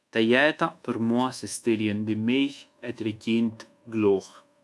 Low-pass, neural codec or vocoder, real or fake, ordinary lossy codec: none; codec, 24 kHz, 1.2 kbps, DualCodec; fake; none